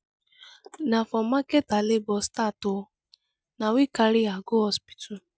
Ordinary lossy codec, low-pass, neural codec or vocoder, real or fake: none; none; none; real